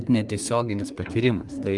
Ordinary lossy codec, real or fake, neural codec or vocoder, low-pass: Opus, 32 kbps; fake; codec, 24 kHz, 1 kbps, SNAC; 10.8 kHz